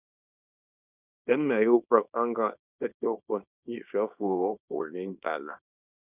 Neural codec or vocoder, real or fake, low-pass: codec, 24 kHz, 0.9 kbps, WavTokenizer, small release; fake; 3.6 kHz